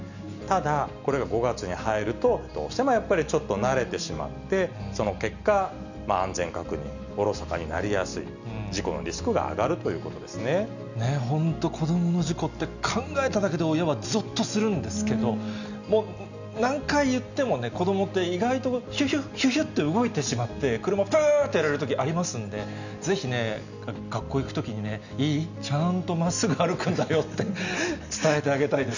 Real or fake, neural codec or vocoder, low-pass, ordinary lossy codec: real; none; 7.2 kHz; none